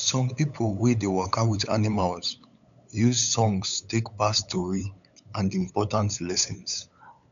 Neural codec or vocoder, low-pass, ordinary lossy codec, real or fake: codec, 16 kHz, 8 kbps, FunCodec, trained on LibriTTS, 25 frames a second; 7.2 kHz; none; fake